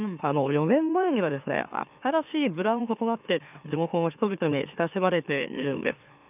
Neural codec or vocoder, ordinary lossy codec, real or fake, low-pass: autoencoder, 44.1 kHz, a latent of 192 numbers a frame, MeloTTS; none; fake; 3.6 kHz